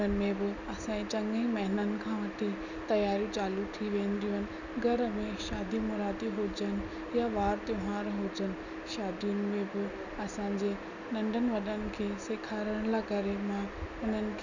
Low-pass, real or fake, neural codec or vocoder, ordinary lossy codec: 7.2 kHz; real; none; none